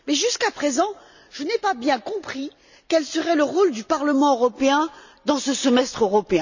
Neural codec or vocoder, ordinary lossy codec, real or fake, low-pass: none; none; real; 7.2 kHz